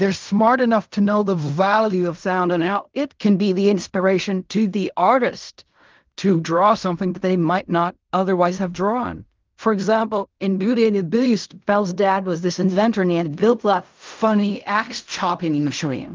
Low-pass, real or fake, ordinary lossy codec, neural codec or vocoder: 7.2 kHz; fake; Opus, 24 kbps; codec, 16 kHz in and 24 kHz out, 0.4 kbps, LongCat-Audio-Codec, fine tuned four codebook decoder